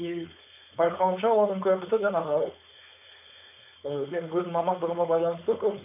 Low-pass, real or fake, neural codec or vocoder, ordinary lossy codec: 3.6 kHz; fake; codec, 16 kHz, 4.8 kbps, FACodec; none